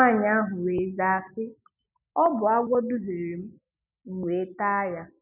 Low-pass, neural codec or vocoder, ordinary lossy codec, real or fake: 3.6 kHz; none; none; real